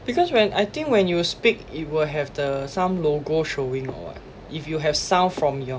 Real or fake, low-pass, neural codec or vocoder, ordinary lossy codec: real; none; none; none